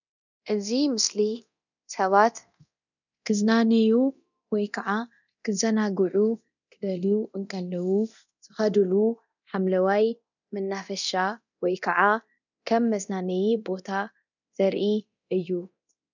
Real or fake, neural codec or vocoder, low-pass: fake; codec, 24 kHz, 0.9 kbps, DualCodec; 7.2 kHz